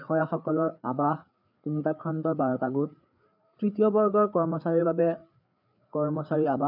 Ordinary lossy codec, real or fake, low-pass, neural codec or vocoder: none; fake; 5.4 kHz; codec, 16 kHz, 4 kbps, FreqCodec, larger model